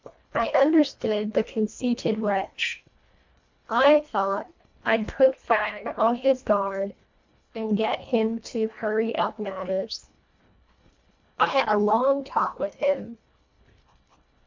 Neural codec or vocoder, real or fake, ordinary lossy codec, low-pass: codec, 24 kHz, 1.5 kbps, HILCodec; fake; MP3, 64 kbps; 7.2 kHz